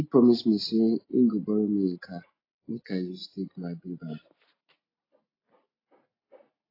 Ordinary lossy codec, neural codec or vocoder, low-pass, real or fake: AAC, 24 kbps; none; 5.4 kHz; real